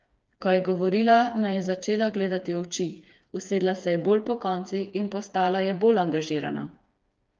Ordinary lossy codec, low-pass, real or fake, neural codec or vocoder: Opus, 24 kbps; 7.2 kHz; fake; codec, 16 kHz, 4 kbps, FreqCodec, smaller model